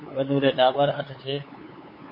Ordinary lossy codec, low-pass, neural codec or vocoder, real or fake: MP3, 24 kbps; 5.4 kHz; codec, 16 kHz, 8 kbps, FunCodec, trained on LibriTTS, 25 frames a second; fake